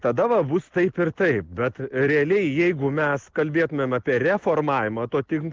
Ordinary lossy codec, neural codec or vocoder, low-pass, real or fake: Opus, 16 kbps; none; 7.2 kHz; real